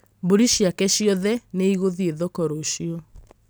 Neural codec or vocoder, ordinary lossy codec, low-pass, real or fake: none; none; none; real